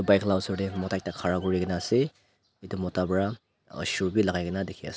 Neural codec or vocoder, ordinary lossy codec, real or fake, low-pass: none; none; real; none